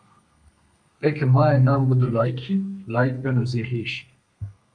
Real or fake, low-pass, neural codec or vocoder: fake; 9.9 kHz; codec, 32 kHz, 1.9 kbps, SNAC